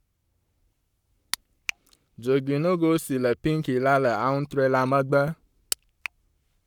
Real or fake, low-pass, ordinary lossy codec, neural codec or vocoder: fake; 19.8 kHz; none; codec, 44.1 kHz, 7.8 kbps, Pupu-Codec